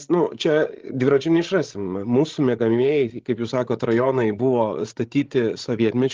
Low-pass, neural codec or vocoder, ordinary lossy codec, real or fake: 7.2 kHz; codec, 16 kHz, 16 kbps, FreqCodec, larger model; Opus, 16 kbps; fake